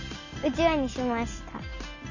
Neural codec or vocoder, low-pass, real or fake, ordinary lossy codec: none; 7.2 kHz; real; none